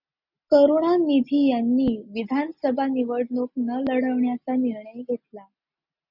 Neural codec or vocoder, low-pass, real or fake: none; 5.4 kHz; real